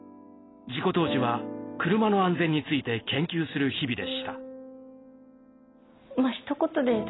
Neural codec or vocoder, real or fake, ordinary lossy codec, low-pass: none; real; AAC, 16 kbps; 7.2 kHz